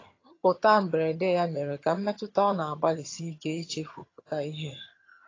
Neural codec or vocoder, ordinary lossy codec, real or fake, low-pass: vocoder, 22.05 kHz, 80 mel bands, HiFi-GAN; AAC, 32 kbps; fake; 7.2 kHz